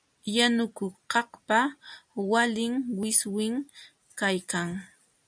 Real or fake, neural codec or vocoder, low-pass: real; none; 9.9 kHz